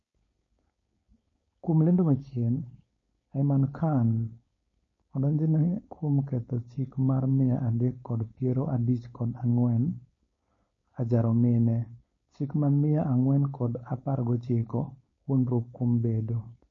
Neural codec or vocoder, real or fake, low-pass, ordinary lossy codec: codec, 16 kHz, 4.8 kbps, FACodec; fake; 7.2 kHz; MP3, 32 kbps